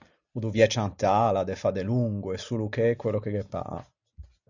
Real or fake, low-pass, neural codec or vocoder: real; 7.2 kHz; none